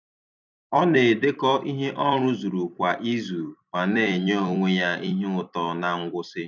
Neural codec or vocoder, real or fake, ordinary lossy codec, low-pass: vocoder, 44.1 kHz, 128 mel bands every 256 samples, BigVGAN v2; fake; none; 7.2 kHz